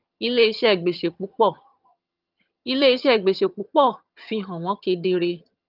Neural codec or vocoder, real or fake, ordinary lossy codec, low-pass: vocoder, 22.05 kHz, 80 mel bands, HiFi-GAN; fake; Opus, 24 kbps; 5.4 kHz